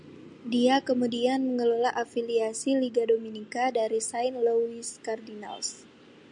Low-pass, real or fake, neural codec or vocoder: 9.9 kHz; real; none